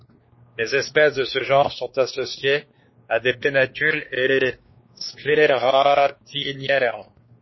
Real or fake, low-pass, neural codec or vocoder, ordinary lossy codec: fake; 7.2 kHz; codec, 16 kHz, 2 kbps, X-Codec, WavLM features, trained on Multilingual LibriSpeech; MP3, 24 kbps